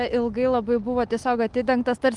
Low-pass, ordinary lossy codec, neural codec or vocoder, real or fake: 10.8 kHz; Opus, 24 kbps; none; real